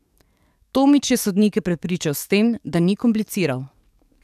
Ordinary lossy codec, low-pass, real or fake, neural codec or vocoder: none; 14.4 kHz; fake; codec, 44.1 kHz, 7.8 kbps, DAC